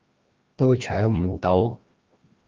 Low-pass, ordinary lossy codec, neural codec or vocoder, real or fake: 7.2 kHz; Opus, 32 kbps; codec, 16 kHz, 1 kbps, FreqCodec, larger model; fake